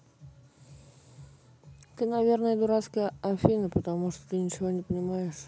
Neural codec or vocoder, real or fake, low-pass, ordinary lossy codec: none; real; none; none